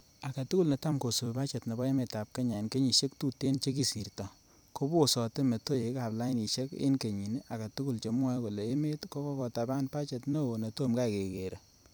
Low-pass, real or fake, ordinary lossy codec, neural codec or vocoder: none; fake; none; vocoder, 44.1 kHz, 128 mel bands every 256 samples, BigVGAN v2